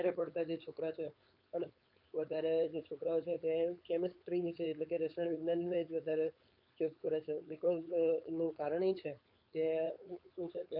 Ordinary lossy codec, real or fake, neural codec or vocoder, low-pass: none; fake; codec, 16 kHz, 4.8 kbps, FACodec; 5.4 kHz